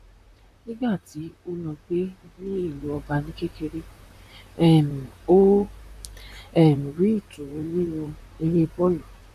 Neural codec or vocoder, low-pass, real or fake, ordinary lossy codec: vocoder, 44.1 kHz, 128 mel bands, Pupu-Vocoder; 14.4 kHz; fake; none